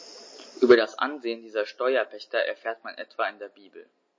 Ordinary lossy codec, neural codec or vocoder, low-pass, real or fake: MP3, 32 kbps; none; 7.2 kHz; real